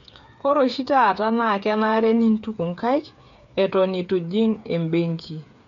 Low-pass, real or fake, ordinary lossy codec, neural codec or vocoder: 7.2 kHz; fake; none; codec, 16 kHz, 8 kbps, FreqCodec, smaller model